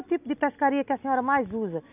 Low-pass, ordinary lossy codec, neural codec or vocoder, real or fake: 3.6 kHz; none; none; real